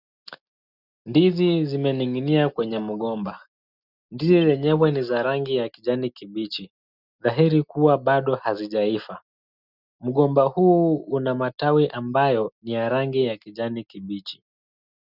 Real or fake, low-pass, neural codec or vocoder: real; 5.4 kHz; none